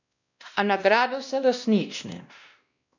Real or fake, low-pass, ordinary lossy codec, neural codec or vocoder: fake; 7.2 kHz; none; codec, 16 kHz, 1 kbps, X-Codec, WavLM features, trained on Multilingual LibriSpeech